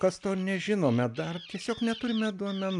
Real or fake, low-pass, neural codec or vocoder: real; 10.8 kHz; none